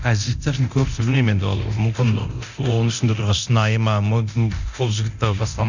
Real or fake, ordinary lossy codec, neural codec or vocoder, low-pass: fake; none; codec, 24 kHz, 0.9 kbps, DualCodec; 7.2 kHz